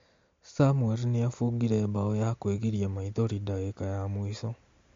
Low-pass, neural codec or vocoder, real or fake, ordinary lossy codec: 7.2 kHz; none; real; MP3, 48 kbps